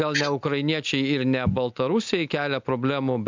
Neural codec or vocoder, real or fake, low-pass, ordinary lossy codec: none; real; 7.2 kHz; MP3, 64 kbps